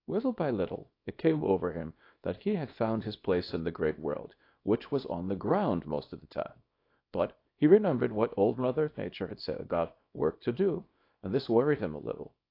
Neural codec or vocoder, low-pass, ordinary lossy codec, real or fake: codec, 24 kHz, 0.9 kbps, WavTokenizer, small release; 5.4 kHz; AAC, 32 kbps; fake